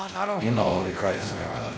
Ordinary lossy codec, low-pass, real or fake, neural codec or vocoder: none; none; fake; codec, 16 kHz, 1 kbps, X-Codec, WavLM features, trained on Multilingual LibriSpeech